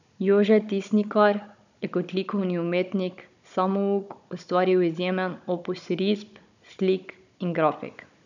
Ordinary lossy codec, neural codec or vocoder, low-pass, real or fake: none; codec, 16 kHz, 16 kbps, FunCodec, trained on Chinese and English, 50 frames a second; 7.2 kHz; fake